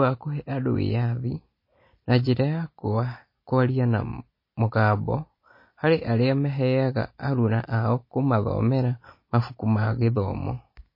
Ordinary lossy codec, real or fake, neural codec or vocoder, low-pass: MP3, 24 kbps; real; none; 5.4 kHz